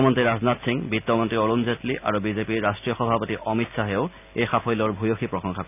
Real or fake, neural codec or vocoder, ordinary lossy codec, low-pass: real; none; none; 3.6 kHz